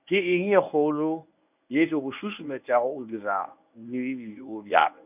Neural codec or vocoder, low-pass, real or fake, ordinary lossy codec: codec, 24 kHz, 0.9 kbps, WavTokenizer, medium speech release version 1; 3.6 kHz; fake; none